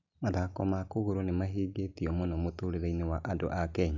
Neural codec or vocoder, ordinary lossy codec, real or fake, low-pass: none; none; real; 7.2 kHz